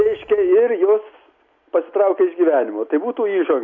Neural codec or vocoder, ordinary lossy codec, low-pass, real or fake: none; MP3, 48 kbps; 7.2 kHz; real